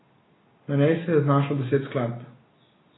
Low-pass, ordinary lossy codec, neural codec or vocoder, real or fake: 7.2 kHz; AAC, 16 kbps; none; real